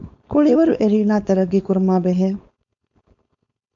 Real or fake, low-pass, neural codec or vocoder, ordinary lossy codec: fake; 7.2 kHz; codec, 16 kHz, 4.8 kbps, FACodec; MP3, 64 kbps